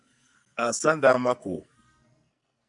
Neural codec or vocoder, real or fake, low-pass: codec, 44.1 kHz, 2.6 kbps, SNAC; fake; 10.8 kHz